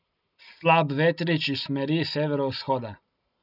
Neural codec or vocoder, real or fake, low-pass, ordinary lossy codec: none; real; 5.4 kHz; none